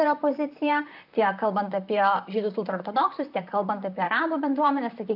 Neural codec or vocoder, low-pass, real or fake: vocoder, 44.1 kHz, 128 mel bands, Pupu-Vocoder; 5.4 kHz; fake